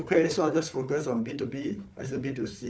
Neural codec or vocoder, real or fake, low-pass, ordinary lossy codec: codec, 16 kHz, 4 kbps, FunCodec, trained on Chinese and English, 50 frames a second; fake; none; none